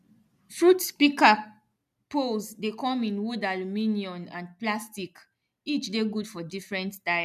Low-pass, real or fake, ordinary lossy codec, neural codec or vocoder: 14.4 kHz; real; none; none